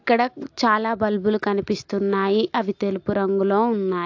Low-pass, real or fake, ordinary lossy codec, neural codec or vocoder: 7.2 kHz; real; AAC, 48 kbps; none